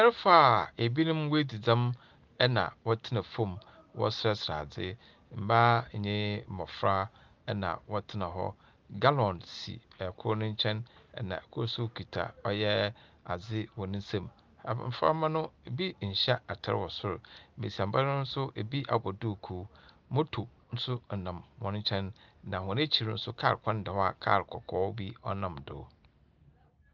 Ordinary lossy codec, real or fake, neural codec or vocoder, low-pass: Opus, 32 kbps; real; none; 7.2 kHz